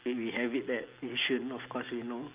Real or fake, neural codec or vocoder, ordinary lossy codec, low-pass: real; none; Opus, 32 kbps; 3.6 kHz